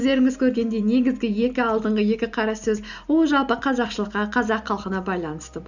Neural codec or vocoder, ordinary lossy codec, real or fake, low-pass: none; none; real; 7.2 kHz